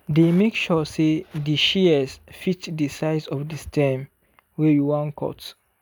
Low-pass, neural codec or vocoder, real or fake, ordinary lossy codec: none; none; real; none